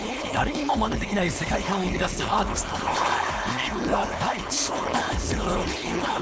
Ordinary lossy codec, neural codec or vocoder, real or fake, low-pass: none; codec, 16 kHz, 4.8 kbps, FACodec; fake; none